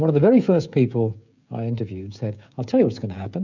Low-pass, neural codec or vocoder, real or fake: 7.2 kHz; codec, 16 kHz, 16 kbps, FreqCodec, smaller model; fake